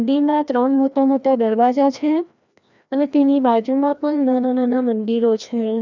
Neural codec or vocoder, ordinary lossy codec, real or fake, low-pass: codec, 16 kHz, 1 kbps, FreqCodec, larger model; none; fake; 7.2 kHz